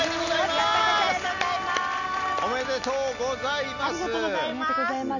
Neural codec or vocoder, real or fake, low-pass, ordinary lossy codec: none; real; 7.2 kHz; none